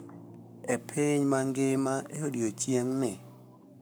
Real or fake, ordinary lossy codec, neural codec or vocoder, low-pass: fake; none; codec, 44.1 kHz, 7.8 kbps, Pupu-Codec; none